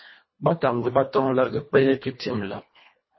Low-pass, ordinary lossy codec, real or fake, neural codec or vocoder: 7.2 kHz; MP3, 24 kbps; fake; codec, 24 kHz, 1.5 kbps, HILCodec